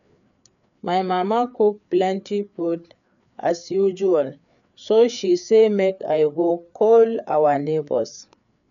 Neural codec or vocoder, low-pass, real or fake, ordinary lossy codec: codec, 16 kHz, 4 kbps, FreqCodec, larger model; 7.2 kHz; fake; none